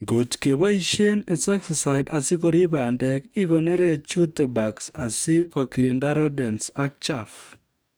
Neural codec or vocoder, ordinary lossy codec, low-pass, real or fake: codec, 44.1 kHz, 2.6 kbps, DAC; none; none; fake